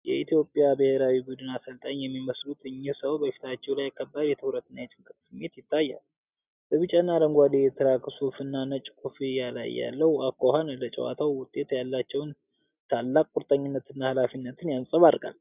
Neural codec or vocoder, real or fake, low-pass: none; real; 3.6 kHz